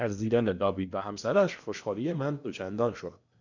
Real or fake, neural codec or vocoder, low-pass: fake; codec, 16 kHz in and 24 kHz out, 0.8 kbps, FocalCodec, streaming, 65536 codes; 7.2 kHz